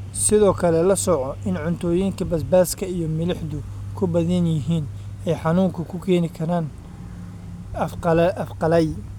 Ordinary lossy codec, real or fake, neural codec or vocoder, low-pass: none; real; none; 19.8 kHz